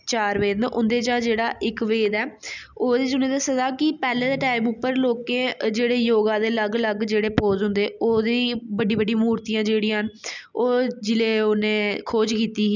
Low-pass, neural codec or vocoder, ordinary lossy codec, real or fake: 7.2 kHz; none; none; real